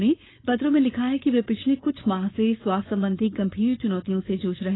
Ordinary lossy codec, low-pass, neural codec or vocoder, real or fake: AAC, 16 kbps; 7.2 kHz; codec, 16 kHz, 4.8 kbps, FACodec; fake